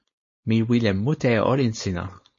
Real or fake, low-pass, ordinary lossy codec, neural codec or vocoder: fake; 7.2 kHz; MP3, 32 kbps; codec, 16 kHz, 4.8 kbps, FACodec